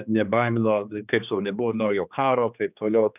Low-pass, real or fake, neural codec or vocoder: 3.6 kHz; fake; codec, 16 kHz, 2 kbps, X-Codec, HuBERT features, trained on general audio